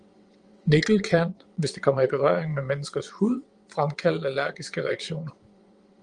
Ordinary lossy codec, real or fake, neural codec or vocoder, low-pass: Opus, 24 kbps; fake; vocoder, 22.05 kHz, 80 mel bands, WaveNeXt; 9.9 kHz